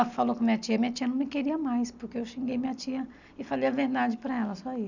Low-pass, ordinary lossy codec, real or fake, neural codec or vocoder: 7.2 kHz; none; real; none